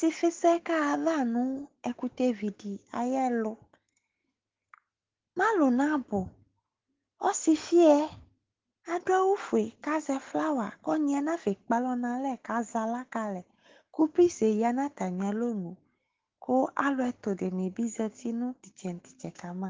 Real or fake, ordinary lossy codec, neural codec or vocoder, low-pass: fake; Opus, 16 kbps; autoencoder, 48 kHz, 128 numbers a frame, DAC-VAE, trained on Japanese speech; 7.2 kHz